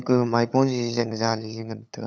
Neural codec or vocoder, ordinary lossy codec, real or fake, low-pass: codec, 16 kHz, 8 kbps, FunCodec, trained on LibriTTS, 25 frames a second; none; fake; none